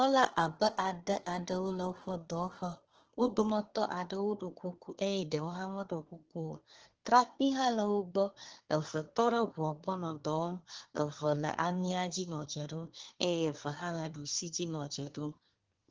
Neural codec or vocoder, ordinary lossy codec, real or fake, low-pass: codec, 24 kHz, 1 kbps, SNAC; Opus, 16 kbps; fake; 7.2 kHz